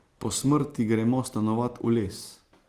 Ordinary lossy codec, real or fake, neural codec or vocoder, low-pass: Opus, 24 kbps; real; none; 14.4 kHz